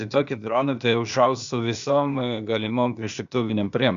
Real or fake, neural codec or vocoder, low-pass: fake; codec, 16 kHz, 0.8 kbps, ZipCodec; 7.2 kHz